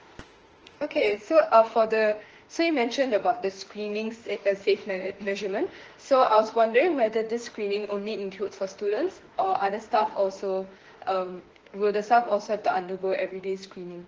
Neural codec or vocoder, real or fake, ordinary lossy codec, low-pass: autoencoder, 48 kHz, 32 numbers a frame, DAC-VAE, trained on Japanese speech; fake; Opus, 16 kbps; 7.2 kHz